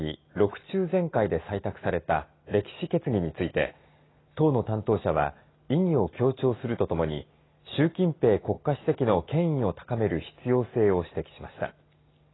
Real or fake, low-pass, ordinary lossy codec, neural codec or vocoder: real; 7.2 kHz; AAC, 16 kbps; none